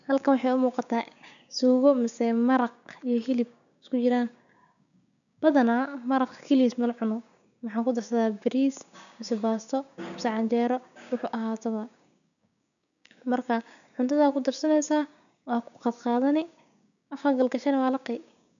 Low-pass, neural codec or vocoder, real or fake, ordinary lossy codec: 7.2 kHz; codec, 16 kHz, 6 kbps, DAC; fake; none